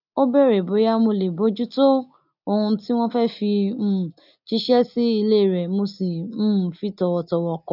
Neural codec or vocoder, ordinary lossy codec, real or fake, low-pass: none; none; real; 5.4 kHz